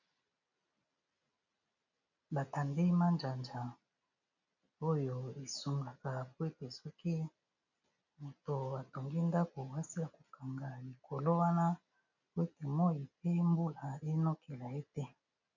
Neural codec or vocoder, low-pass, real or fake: none; 7.2 kHz; real